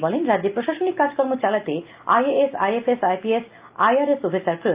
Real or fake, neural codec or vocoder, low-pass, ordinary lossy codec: real; none; 3.6 kHz; Opus, 24 kbps